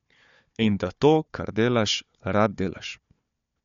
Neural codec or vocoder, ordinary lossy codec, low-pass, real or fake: codec, 16 kHz, 4 kbps, FunCodec, trained on Chinese and English, 50 frames a second; MP3, 48 kbps; 7.2 kHz; fake